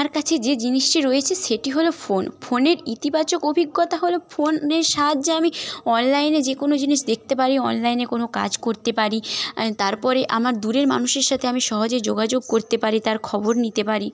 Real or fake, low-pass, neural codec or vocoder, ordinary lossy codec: real; none; none; none